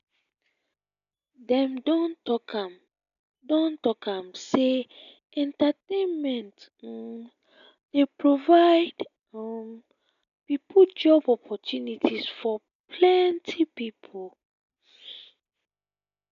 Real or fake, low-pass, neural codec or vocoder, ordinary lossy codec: real; 7.2 kHz; none; none